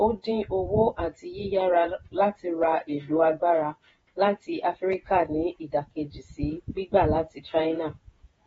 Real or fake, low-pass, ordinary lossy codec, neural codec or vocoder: fake; 19.8 kHz; AAC, 24 kbps; vocoder, 48 kHz, 128 mel bands, Vocos